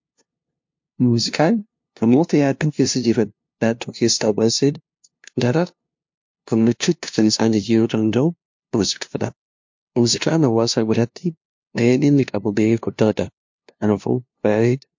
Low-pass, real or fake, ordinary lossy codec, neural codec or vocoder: 7.2 kHz; fake; MP3, 48 kbps; codec, 16 kHz, 0.5 kbps, FunCodec, trained on LibriTTS, 25 frames a second